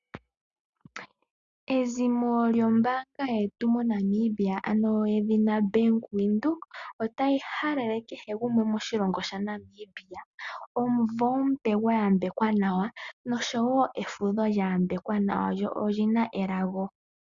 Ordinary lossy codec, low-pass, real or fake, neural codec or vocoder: Opus, 64 kbps; 7.2 kHz; real; none